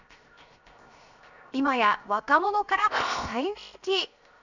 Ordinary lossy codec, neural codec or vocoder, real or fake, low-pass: none; codec, 16 kHz, 0.7 kbps, FocalCodec; fake; 7.2 kHz